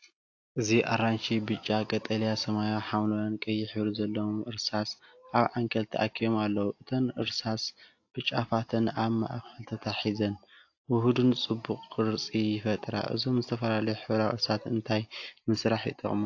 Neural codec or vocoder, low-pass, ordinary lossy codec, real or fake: none; 7.2 kHz; AAC, 48 kbps; real